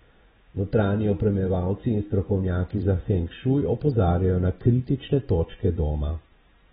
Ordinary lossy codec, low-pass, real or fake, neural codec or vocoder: AAC, 16 kbps; 19.8 kHz; fake; vocoder, 44.1 kHz, 128 mel bands every 512 samples, BigVGAN v2